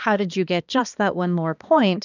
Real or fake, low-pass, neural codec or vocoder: fake; 7.2 kHz; codec, 16 kHz, 2 kbps, X-Codec, HuBERT features, trained on balanced general audio